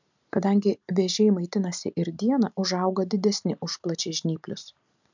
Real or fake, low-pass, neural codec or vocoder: real; 7.2 kHz; none